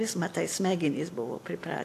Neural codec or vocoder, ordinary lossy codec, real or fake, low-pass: none; AAC, 64 kbps; real; 14.4 kHz